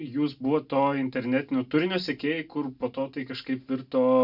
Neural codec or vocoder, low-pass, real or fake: none; 5.4 kHz; real